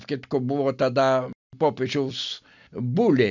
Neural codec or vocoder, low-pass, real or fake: none; 7.2 kHz; real